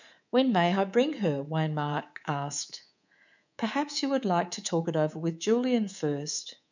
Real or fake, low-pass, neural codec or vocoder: fake; 7.2 kHz; codec, 24 kHz, 3.1 kbps, DualCodec